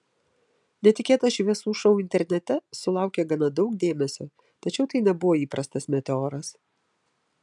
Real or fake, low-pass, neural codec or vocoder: real; 10.8 kHz; none